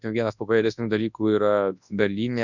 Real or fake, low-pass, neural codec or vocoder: fake; 7.2 kHz; codec, 24 kHz, 0.9 kbps, WavTokenizer, large speech release